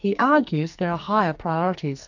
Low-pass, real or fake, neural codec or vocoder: 7.2 kHz; fake; codec, 44.1 kHz, 2.6 kbps, SNAC